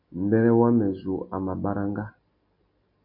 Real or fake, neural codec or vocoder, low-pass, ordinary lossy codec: real; none; 5.4 kHz; MP3, 32 kbps